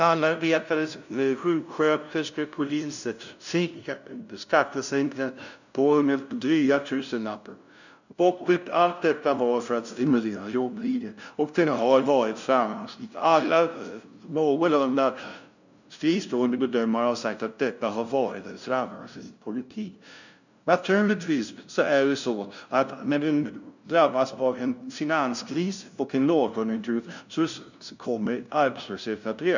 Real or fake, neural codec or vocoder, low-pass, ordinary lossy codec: fake; codec, 16 kHz, 0.5 kbps, FunCodec, trained on LibriTTS, 25 frames a second; 7.2 kHz; none